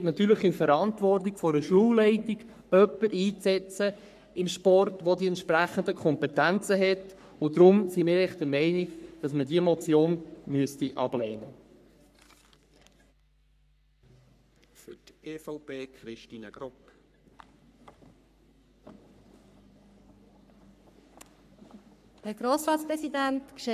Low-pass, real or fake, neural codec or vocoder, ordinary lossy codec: 14.4 kHz; fake; codec, 44.1 kHz, 3.4 kbps, Pupu-Codec; none